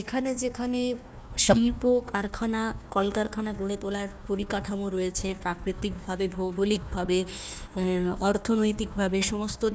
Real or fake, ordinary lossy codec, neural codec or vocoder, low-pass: fake; none; codec, 16 kHz, 1 kbps, FunCodec, trained on Chinese and English, 50 frames a second; none